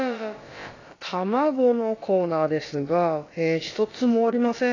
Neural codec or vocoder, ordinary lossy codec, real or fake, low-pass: codec, 16 kHz, about 1 kbps, DyCAST, with the encoder's durations; AAC, 32 kbps; fake; 7.2 kHz